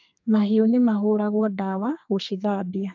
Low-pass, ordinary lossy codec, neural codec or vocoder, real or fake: 7.2 kHz; AAC, 48 kbps; codec, 32 kHz, 1.9 kbps, SNAC; fake